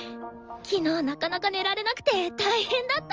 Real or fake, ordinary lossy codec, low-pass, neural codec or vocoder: real; Opus, 24 kbps; 7.2 kHz; none